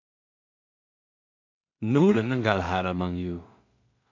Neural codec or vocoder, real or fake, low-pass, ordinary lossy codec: codec, 16 kHz in and 24 kHz out, 0.4 kbps, LongCat-Audio-Codec, two codebook decoder; fake; 7.2 kHz; AAC, 48 kbps